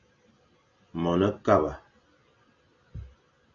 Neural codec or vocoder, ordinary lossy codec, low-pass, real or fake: none; MP3, 64 kbps; 7.2 kHz; real